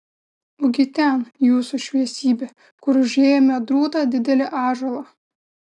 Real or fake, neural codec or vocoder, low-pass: real; none; 10.8 kHz